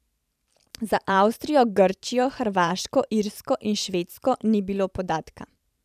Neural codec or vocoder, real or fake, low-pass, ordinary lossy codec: vocoder, 44.1 kHz, 128 mel bands every 512 samples, BigVGAN v2; fake; 14.4 kHz; none